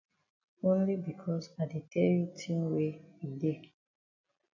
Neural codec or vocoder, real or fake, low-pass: none; real; 7.2 kHz